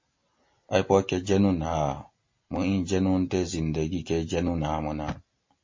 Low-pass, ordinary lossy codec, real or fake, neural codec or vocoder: 7.2 kHz; MP3, 32 kbps; real; none